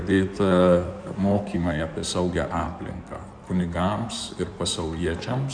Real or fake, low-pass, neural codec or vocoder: fake; 9.9 kHz; codec, 16 kHz in and 24 kHz out, 2.2 kbps, FireRedTTS-2 codec